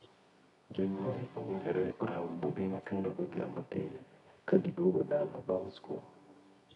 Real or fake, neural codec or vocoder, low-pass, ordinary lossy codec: fake; codec, 24 kHz, 0.9 kbps, WavTokenizer, medium music audio release; 10.8 kHz; none